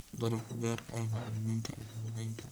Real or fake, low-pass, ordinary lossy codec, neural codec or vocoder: fake; none; none; codec, 44.1 kHz, 1.7 kbps, Pupu-Codec